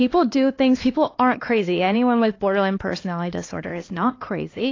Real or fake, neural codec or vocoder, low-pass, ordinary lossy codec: fake; codec, 16 kHz, 2 kbps, X-Codec, HuBERT features, trained on LibriSpeech; 7.2 kHz; AAC, 32 kbps